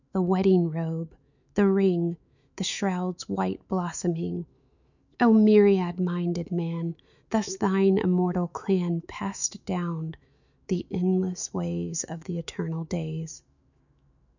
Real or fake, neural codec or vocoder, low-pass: fake; autoencoder, 48 kHz, 128 numbers a frame, DAC-VAE, trained on Japanese speech; 7.2 kHz